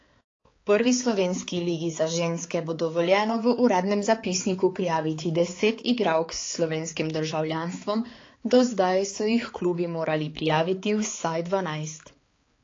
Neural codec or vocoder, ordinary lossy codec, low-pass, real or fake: codec, 16 kHz, 4 kbps, X-Codec, HuBERT features, trained on balanced general audio; AAC, 32 kbps; 7.2 kHz; fake